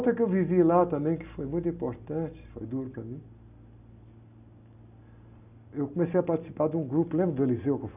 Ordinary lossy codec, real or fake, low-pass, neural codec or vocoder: none; real; 3.6 kHz; none